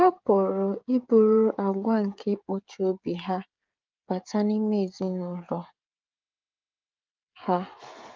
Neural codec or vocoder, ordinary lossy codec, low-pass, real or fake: codec, 16 kHz, 16 kbps, FreqCodec, smaller model; Opus, 24 kbps; 7.2 kHz; fake